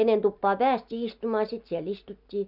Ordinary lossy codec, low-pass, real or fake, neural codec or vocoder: none; 5.4 kHz; real; none